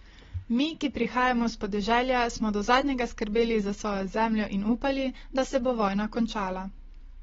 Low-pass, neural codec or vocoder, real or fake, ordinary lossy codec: 7.2 kHz; none; real; AAC, 24 kbps